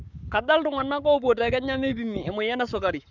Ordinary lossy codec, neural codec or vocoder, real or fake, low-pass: none; codec, 44.1 kHz, 7.8 kbps, Pupu-Codec; fake; 7.2 kHz